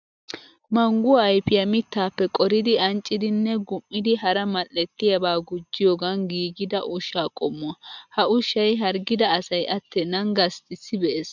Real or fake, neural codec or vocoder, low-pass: real; none; 7.2 kHz